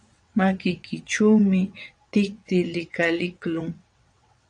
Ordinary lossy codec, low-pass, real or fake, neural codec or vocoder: MP3, 64 kbps; 9.9 kHz; fake; vocoder, 22.05 kHz, 80 mel bands, WaveNeXt